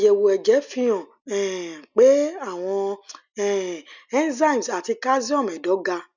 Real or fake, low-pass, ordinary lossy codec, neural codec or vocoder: real; 7.2 kHz; none; none